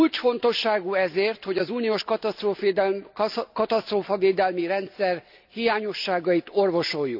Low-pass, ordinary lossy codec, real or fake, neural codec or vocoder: 5.4 kHz; none; real; none